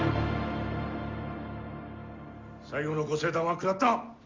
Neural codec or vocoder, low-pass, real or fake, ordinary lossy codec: none; 7.2 kHz; real; Opus, 32 kbps